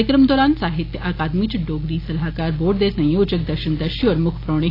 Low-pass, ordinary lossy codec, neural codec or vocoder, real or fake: 5.4 kHz; AAC, 24 kbps; none; real